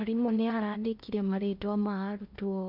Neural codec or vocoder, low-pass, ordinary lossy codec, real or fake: codec, 16 kHz in and 24 kHz out, 0.6 kbps, FocalCodec, streaming, 2048 codes; 5.4 kHz; none; fake